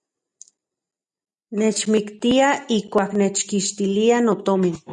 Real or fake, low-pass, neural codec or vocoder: real; 10.8 kHz; none